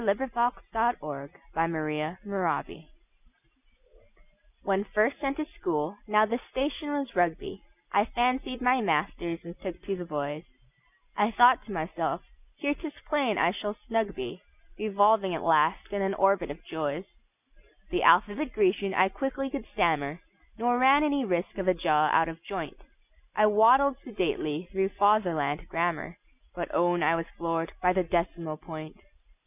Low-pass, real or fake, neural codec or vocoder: 3.6 kHz; real; none